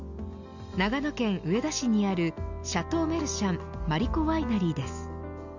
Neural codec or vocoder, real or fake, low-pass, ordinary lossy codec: none; real; 7.2 kHz; none